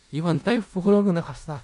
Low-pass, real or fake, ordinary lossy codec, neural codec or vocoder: 10.8 kHz; fake; AAC, 64 kbps; codec, 16 kHz in and 24 kHz out, 0.4 kbps, LongCat-Audio-Codec, four codebook decoder